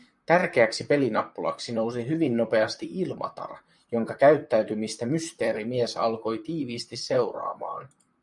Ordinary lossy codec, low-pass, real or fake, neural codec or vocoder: MP3, 96 kbps; 10.8 kHz; fake; vocoder, 44.1 kHz, 128 mel bands, Pupu-Vocoder